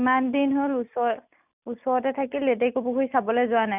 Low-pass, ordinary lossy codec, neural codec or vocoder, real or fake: 3.6 kHz; none; none; real